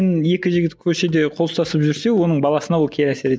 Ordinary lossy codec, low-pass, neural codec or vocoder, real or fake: none; none; none; real